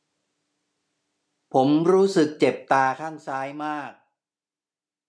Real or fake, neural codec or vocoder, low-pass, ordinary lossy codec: real; none; none; none